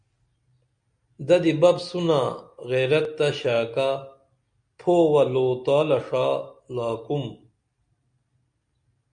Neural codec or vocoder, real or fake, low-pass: none; real; 9.9 kHz